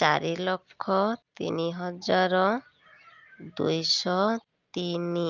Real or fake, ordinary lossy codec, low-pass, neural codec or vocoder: real; Opus, 24 kbps; 7.2 kHz; none